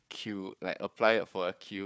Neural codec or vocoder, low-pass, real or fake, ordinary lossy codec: codec, 16 kHz, 4 kbps, FunCodec, trained on Chinese and English, 50 frames a second; none; fake; none